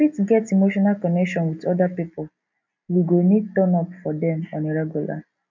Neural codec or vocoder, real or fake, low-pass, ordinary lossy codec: none; real; 7.2 kHz; MP3, 64 kbps